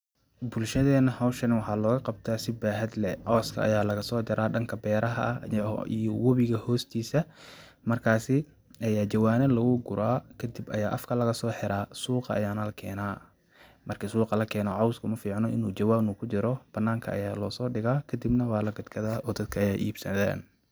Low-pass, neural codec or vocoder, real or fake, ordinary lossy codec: none; none; real; none